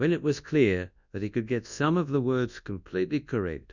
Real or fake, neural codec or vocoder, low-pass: fake; codec, 24 kHz, 0.9 kbps, WavTokenizer, large speech release; 7.2 kHz